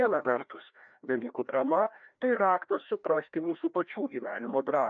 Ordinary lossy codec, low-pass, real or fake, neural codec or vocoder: MP3, 96 kbps; 7.2 kHz; fake; codec, 16 kHz, 1 kbps, FreqCodec, larger model